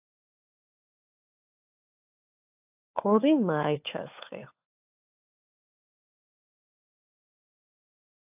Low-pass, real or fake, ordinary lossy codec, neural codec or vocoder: 3.6 kHz; fake; AAC, 32 kbps; codec, 16 kHz, 4 kbps, FunCodec, trained on LibriTTS, 50 frames a second